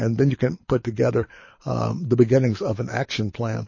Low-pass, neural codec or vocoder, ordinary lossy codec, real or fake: 7.2 kHz; codec, 24 kHz, 6 kbps, HILCodec; MP3, 32 kbps; fake